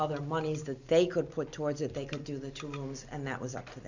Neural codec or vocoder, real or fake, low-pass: none; real; 7.2 kHz